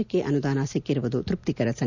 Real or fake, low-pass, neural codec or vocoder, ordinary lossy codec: real; 7.2 kHz; none; none